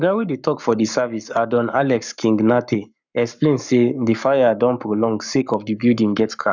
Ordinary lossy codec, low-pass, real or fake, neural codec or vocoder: none; 7.2 kHz; fake; codec, 44.1 kHz, 7.8 kbps, Pupu-Codec